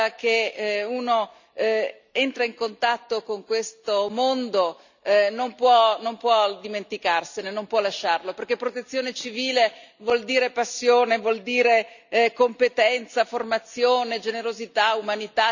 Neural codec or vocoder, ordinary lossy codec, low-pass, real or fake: none; none; 7.2 kHz; real